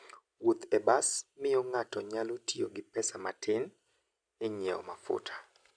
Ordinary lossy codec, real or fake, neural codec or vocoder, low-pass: none; real; none; 9.9 kHz